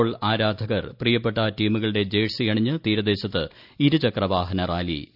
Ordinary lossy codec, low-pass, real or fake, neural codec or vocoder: none; 5.4 kHz; real; none